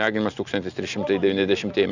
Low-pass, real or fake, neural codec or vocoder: 7.2 kHz; real; none